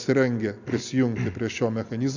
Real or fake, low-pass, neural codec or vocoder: real; 7.2 kHz; none